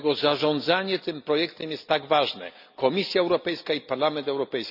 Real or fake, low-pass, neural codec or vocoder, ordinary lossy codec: real; 5.4 kHz; none; none